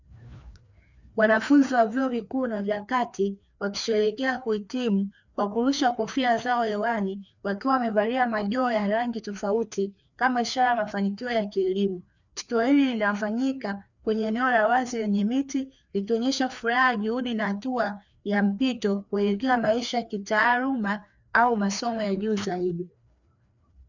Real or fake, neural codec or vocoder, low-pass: fake; codec, 16 kHz, 2 kbps, FreqCodec, larger model; 7.2 kHz